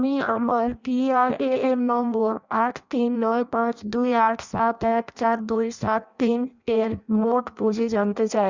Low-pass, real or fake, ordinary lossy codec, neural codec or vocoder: 7.2 kHz; fake; Opus, 64 kbps; codec, 16 kHz in and 24 kHz out, 0.6 kbps, FireRedTTS-2 codec